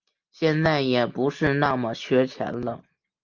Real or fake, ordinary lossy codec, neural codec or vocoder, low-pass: real; Opus, 24 kbps; none; 7.2 kHz